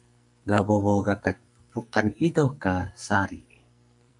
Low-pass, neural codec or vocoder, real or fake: 10.8 kHz; codec, 44.1 kHz, 2.6 kbps, SNAC; fake